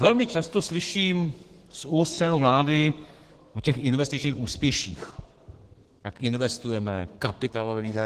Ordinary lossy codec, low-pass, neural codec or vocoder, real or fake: Opus, 16 kbps; 14.4 kHz; codec, 32 kHz, 1.9 kbps, SNAC; fake